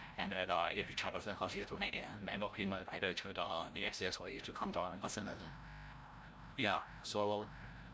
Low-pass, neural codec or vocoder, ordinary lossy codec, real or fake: none; codec, 16 kHz, 0.5 kbps, FreqCodec, larger model; none; fake